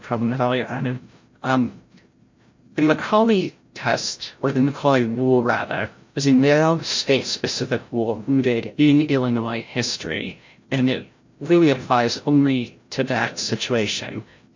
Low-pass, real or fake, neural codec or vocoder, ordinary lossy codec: 7.2 kHz; fake; codec, 16 kHz, 0.5 kbps, FreqCodec, larger model; MP3, 48 kbps